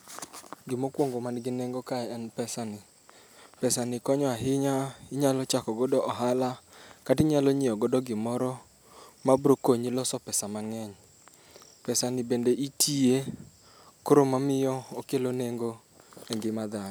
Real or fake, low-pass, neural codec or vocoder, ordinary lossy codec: real; none; none; none